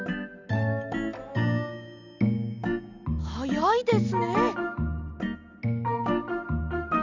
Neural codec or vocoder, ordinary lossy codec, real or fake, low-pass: none; none; real; 7.2 kHz